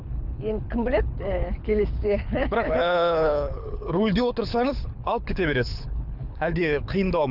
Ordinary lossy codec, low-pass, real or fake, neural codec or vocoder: none; 5.4 kHz; fake; codec, 24 kHz, 6 kbps, HILCodec